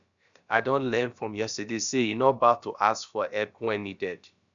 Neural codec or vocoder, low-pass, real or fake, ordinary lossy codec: codec, 16 kHz, about 1 kbps, DyCAST, with the encoder's durations; 7.2 kHz; fake; none